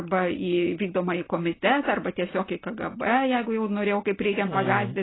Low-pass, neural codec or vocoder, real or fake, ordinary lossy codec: 7.2 kHz; none; real; AAC, 16 kbps